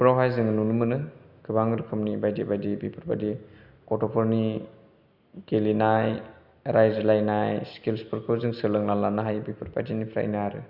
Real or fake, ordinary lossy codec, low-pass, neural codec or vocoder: real; Opus, 64 kbps; 5.4 kHz; none